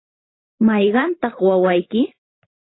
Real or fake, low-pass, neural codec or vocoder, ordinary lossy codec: fake; 7.2 kHz; vocoder, 44.1 kHz, 128 mel bands every 512 samples, BigVGAN v2; AAC, 16 kbps